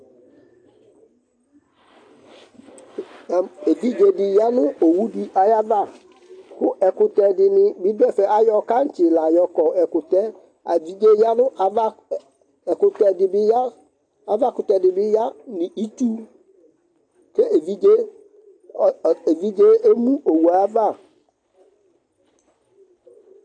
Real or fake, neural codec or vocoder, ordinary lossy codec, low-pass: real; none; AAC, 48 kbps; 9.9 kHz